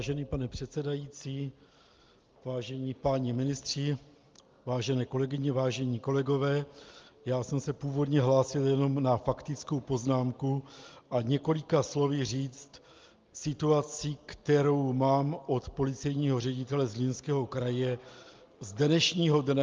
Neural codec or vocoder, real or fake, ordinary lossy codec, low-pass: none; real; Opus, 32 kbps; 7.2 kHz